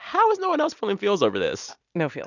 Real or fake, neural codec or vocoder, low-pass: real; none; 7.2 kHz